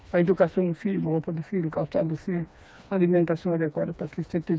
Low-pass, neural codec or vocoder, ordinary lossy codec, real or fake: none; codec, 16 kHz, 2 kbps, FreqCodec, smaller model; none; fake